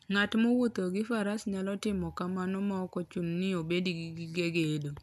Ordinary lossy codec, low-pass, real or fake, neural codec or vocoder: none; 14.4 kHz; real; none